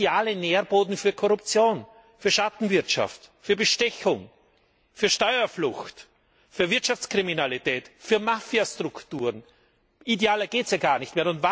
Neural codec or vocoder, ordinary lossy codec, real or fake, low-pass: none; none; real; none